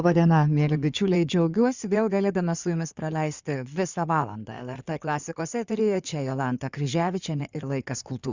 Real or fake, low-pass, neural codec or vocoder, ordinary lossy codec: fake; 7.2 kHz; codec, 16 kHz in and 24 kHz out, 2.2 kbps, FireRedTTS-2 codec; Opus, 64 kbps